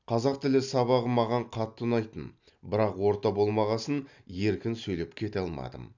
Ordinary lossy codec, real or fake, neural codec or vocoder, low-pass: none; real; none; 7.2 kHz